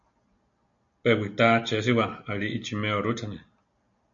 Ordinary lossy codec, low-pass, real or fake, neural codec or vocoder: MP3, 48 kbps; 7.2 kHz; real; none